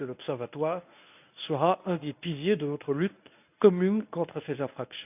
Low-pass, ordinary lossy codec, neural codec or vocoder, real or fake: 3.6 kHz; none; codec, 24 kHz, 0.9 kbps, WavTokenizer, medium speech release version 1; fake